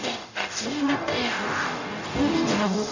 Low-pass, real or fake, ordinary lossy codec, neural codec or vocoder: 7.2 kHz; fake; none; codec, 44.1 kHz, 0.9 kbps, DAC